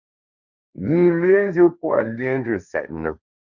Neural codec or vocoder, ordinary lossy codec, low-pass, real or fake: codec, 16 kHz, 1.1 kbps, Voila-Tokenizer; Opus, 64 kbps; 7.2 kHz; fake